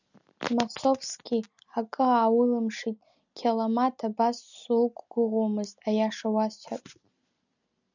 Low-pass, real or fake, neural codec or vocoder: 7.2 kHz; real; none